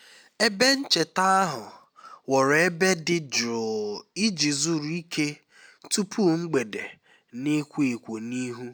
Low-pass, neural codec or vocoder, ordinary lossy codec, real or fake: none; none; none; real